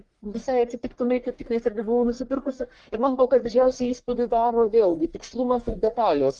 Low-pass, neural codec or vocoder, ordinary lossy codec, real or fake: 10.8 kHz; codec, 44.1 kHz, 1.7 kbps, Pupu-Codec; Opus, 24 kbps; fake